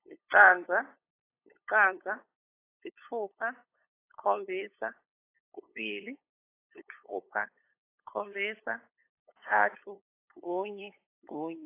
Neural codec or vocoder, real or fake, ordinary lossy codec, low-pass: codec, 16 kHz, 8 kbps, FunCodec, trained on LibriTTS, 25 frames a second; fake; AAC, 24 kbps; 3.6 kHz